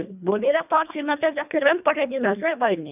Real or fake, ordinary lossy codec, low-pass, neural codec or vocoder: fake; none; 3.6 kHz; codec, 24 kHz, 1.5 kbps, HILCodec